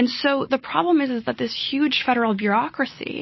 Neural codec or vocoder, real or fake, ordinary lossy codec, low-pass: none; real; MP3, 24 kbps; 7.2 kHz